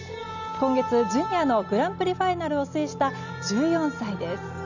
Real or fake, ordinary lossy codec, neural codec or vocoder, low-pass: real; none; none; 7.2 kHz